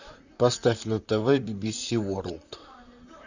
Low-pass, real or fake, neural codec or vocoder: 7.2 kHz; fake; vocoder, 44.1 kHz, 128 mel bands, Pupu-Vocoder